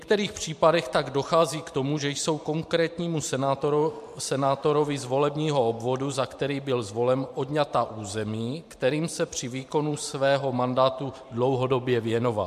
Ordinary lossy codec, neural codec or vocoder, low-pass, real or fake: MP3, 64 kbps; vocoder, 44.1 kHz, 128 mel bands every 512 samples, BigVGAN v2; 14.4 kHz; fake